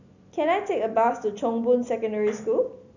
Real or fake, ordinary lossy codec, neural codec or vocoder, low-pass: real; none; none; 7.2 kHz